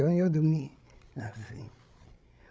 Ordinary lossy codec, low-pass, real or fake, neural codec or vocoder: none; none; fake; codec, 16 kHz, 16 kbps, FreqCodec, larger model